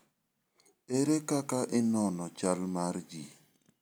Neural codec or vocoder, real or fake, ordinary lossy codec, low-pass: none; real; none; none